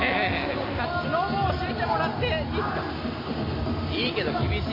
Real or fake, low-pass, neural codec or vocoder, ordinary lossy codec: real; 5.4 kHz; none; MP3, 24 kbps